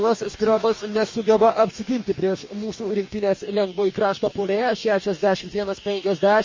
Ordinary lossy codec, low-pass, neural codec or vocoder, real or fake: MP3, 32 kbps; 7.2 kHz; codec, 44.1 kHz, 2.6 kbps, DAC; fake